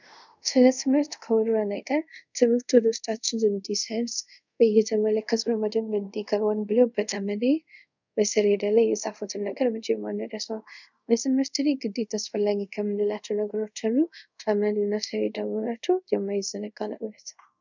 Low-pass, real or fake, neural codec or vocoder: 7.2 kHz; fake; codec, 24 kHz, 0.5 kbps, DualCodec